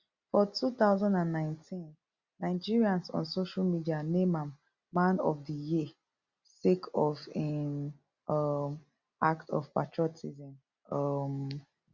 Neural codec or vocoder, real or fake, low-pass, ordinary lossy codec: none; real; 7.2 kHz; Opus, 64 kbps